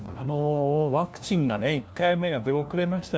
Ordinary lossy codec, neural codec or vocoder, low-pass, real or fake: none; codec, 16 kHz, 1 kbps, FunCodec, trained on LibriTTS, 50 frames a second; none; fake